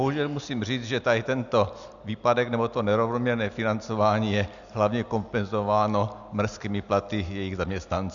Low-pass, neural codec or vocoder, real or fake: 7.2 kHz; none; real